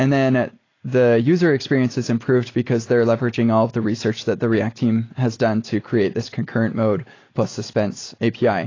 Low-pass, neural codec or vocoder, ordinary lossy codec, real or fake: 7.2 kHz; none; AAC, 32 kbps; real